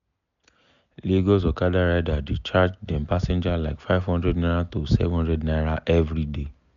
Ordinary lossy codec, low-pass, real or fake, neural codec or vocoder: none; 7.2 kHz; real; none